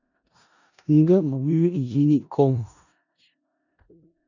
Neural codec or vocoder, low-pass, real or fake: codec, 16 kHz in and 24 kHz out, 0.4 kbps, LongCat-Audio-Codec, four codebook decoder; 7.2 kHz; fake